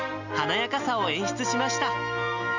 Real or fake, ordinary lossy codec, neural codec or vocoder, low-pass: real; none; none; 7.2 kHz